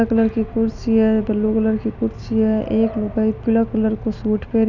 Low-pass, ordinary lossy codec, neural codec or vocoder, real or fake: 7.2 kHz; none; none; real